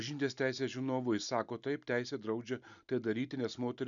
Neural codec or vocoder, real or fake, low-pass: none; real; 7.2 kHz